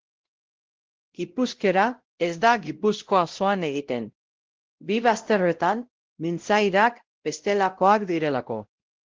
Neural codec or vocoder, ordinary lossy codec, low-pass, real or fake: codec, 16 kHz, 0.5 kbps, X-Codec, WavLM features, trained on Multilingual LibriSpeech; Opus, 16 kbps; 7.2 kHz; fake